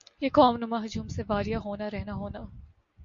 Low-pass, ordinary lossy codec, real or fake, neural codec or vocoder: 7.2 kHz; MP3, 48 kbps; real; none